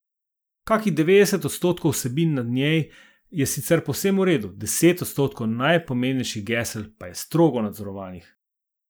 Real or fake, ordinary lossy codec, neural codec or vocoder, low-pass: real; none; none; none